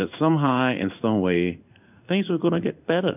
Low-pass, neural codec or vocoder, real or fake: 3.6 kHz; codec, 16 kHz in and 24 kHz out, 1 kbps, XY-Tokenizer; fake